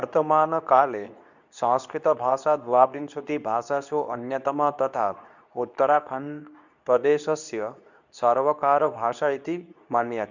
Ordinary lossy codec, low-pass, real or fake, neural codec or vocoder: none; 7.2 kHz; fake; codec, 24 kHz, 0.9 kbps, WavTokenizer, medium speech release version 2